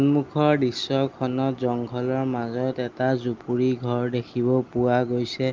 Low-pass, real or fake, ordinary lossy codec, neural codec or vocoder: 7.2 kHz; real; Opus, 32 kbps; none